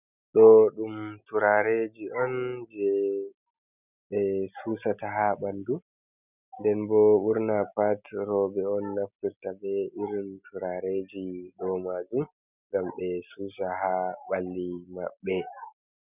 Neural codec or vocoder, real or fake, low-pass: none; real; 3.6 kHz